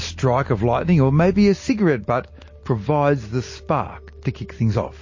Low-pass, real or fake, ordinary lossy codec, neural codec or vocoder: 7.2 kHz; real; MP3, 32 kbps; none